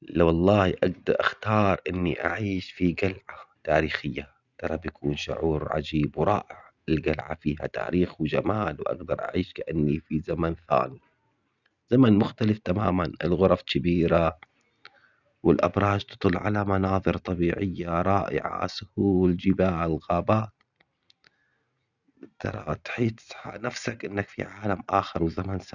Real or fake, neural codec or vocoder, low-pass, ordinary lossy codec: real; none; 7.2 kHz; none